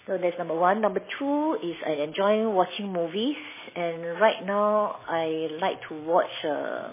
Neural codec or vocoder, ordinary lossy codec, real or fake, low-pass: none; MP3, 16 kbps; real; 3.6 kHz